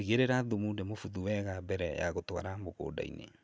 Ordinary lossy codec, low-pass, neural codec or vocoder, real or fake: none; none; none; real